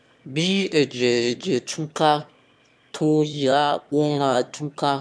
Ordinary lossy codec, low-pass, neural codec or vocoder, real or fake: none; none; autoencoder, 22.05 kHz, a latent of 192 numbers a frame, VITS, trained on one speaker; fake